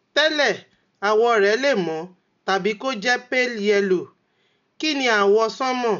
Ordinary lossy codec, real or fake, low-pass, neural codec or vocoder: none; real; 7.2 kHz; none